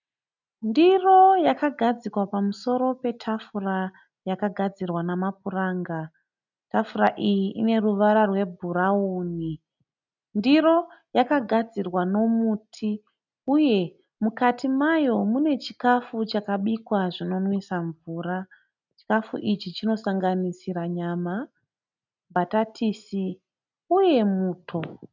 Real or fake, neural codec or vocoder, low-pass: real; none; 7.2 kHz